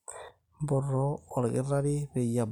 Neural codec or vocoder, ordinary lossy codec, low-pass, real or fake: none; none; 19.8 kHz; real